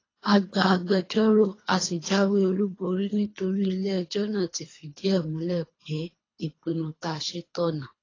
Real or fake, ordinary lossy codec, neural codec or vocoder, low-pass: fake; AAC, 32 kbps; codec, 24 kHz, 3 kbps, HILCodec; 7.2 kHz